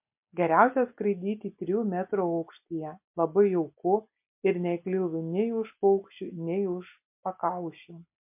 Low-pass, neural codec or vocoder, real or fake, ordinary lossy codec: 3.6 kHz; none; real; MP3, 32 kbps